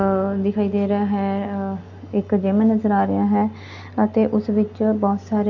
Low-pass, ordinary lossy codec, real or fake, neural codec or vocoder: 7.2 kHz; none; real; none